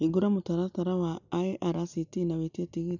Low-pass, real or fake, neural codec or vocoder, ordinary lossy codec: 7.2 kHz; real; none; none